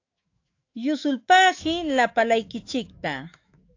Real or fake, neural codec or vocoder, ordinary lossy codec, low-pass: fake; autoencoder, 48 kHz, 128 numbers a frame, DAC-VAE, trained on Japanese speech; AAC, 48 kbps; 7.2 kHz